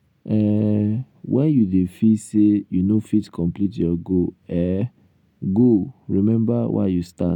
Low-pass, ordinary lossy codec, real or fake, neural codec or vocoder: 19.8 kHz; none; real; none